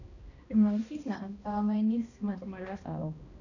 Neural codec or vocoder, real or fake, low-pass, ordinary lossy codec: codec, 16 kHz, 1 kbps, X-Codec, HuBERT features, trained on balanced general audio; fake; 7.2 kHz; none